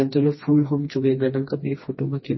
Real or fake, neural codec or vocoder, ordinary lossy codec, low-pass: fake; codec, 16 kHz, 2 kbps, FreqCodec, smaller model; MP3, 24 kbps; 7.2 kHz